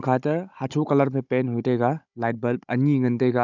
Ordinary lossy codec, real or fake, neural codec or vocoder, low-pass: none; fake; codec, 16 kHz, 16 kbps, FunCodec, trained on Chinese and English, 50 frames a second; 7.2 kHz